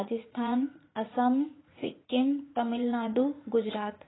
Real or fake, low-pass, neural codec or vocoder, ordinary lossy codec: fake; 7.2 kHz; vocoder, 44.1 kHz, 80 mel bands, Vocos; AAC, 16 kbps